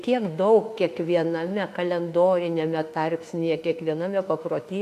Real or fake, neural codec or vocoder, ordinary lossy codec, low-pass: fake; autoencoder, 48 kHz, 32 numbers a frame, DAC-VAE, trained on Japanese speech; AAC, 64 kbps; 14.4 kHz